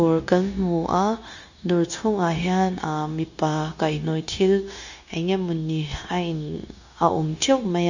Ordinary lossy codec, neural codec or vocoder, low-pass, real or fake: none; codec, 16 kHz, 0.9 kbps, LongCat-Audio-Codec; 7.2 kHz; fake